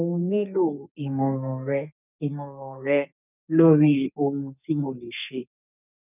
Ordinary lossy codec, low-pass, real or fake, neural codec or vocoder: none; 3.6 kHz; fake; codec, 32 kHz, 1.9 kbps, SNAC